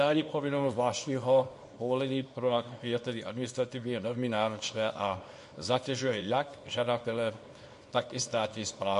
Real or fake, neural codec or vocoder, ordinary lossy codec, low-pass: fake; codec, 24 kHz, 0.9 kbps, WavTokenizer, small release; MP3, 48 kbps; 10.8 kHz